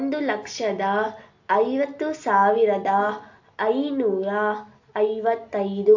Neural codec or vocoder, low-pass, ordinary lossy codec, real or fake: none; 7.2 kHz; AAC, 48 kbps; real